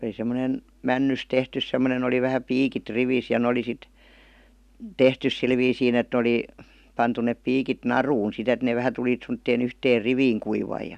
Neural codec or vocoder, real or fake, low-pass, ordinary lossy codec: none; real; 14.4 kHz; none